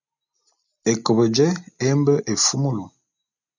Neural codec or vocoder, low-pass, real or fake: none; 7.2 kHz; real